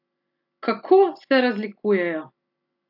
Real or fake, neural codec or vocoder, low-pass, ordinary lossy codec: real; none; 5.4 kHz; AAC, 48 kbps